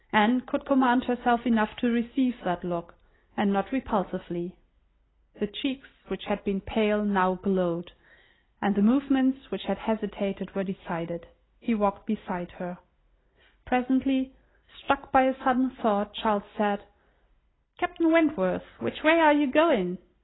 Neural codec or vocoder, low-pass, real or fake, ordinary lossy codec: none; 7.2 kHz; real; AAC, 16 kbps